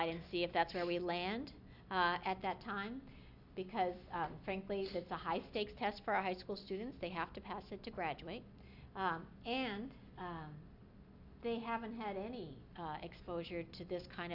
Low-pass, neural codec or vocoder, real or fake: 5.4 kHz; none; real